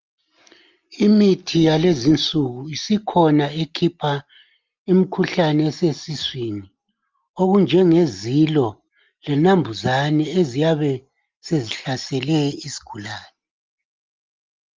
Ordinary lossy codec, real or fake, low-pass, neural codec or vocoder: Opus, 24 kbps; real; 7.2 kHz; none